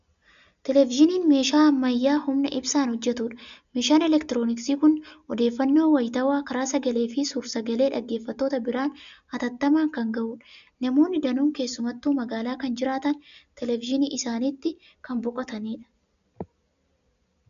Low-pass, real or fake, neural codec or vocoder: 7.2 kHz; real; none